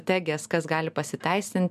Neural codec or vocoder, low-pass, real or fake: none; 14.4 kHz; real